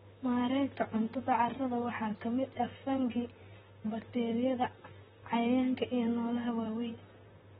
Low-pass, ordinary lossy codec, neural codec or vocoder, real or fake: 19.8 kHz; AAC, 16 kbps; vocoder, 44.1 kHz, 128 mel bands, Pupu-Vocoder; fake